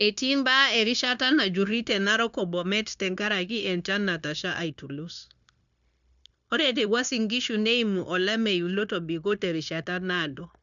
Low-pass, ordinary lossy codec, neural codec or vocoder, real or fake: 7.2 kHz; none; codec, 16 kHz, 0.9 kbps, LongCat-Audio-Codec; fake